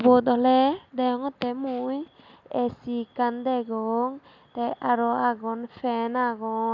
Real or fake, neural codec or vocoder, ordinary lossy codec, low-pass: real; none; none; 7.2 kHz